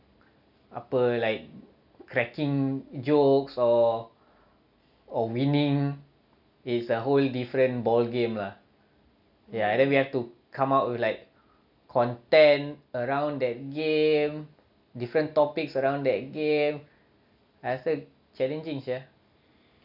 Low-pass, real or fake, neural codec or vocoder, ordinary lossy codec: 5.4 kHz; real; none; none